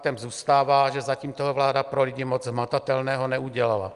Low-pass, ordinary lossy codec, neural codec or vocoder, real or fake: 10.8 kHz; Opus, 32 kbps; none; real